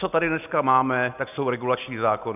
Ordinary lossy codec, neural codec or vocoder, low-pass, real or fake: AAC, 32 kbps; none; 3.6 kHz; real